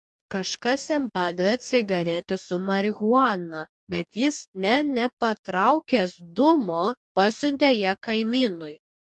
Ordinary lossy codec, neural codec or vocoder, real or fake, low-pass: MP3, 64 kbps; codec, 44.1 kHz, 2.6 kbps, DAC; fake; 10.8 kHz